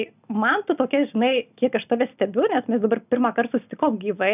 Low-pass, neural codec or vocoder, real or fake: 3.6 kHz; none; real